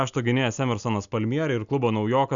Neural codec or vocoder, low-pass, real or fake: none; 7.2 kHz; real